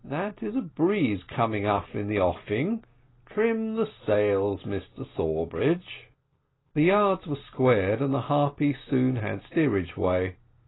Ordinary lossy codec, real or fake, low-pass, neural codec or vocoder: AAC, 16 kbps; real; 7.2 kHz; none